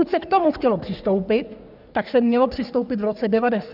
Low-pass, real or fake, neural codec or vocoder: 5.4 kHz; fake; codec, 44.1 kHz, 3.4 kbps, Pupu-Codec